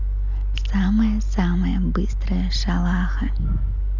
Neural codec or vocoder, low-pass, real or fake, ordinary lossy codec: none; 7.2 kHz; real; none